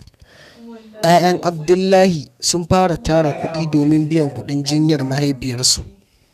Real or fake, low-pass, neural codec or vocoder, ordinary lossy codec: fake; 14.4 kHz; codec, 32 kHz, 1.9 kbps, SNAC; none